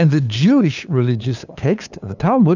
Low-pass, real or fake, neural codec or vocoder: 7.2 kHz; fake; codec, 16 kHz, 2 kbps, FunCodec, trained on LibriTTS, 25 frames a second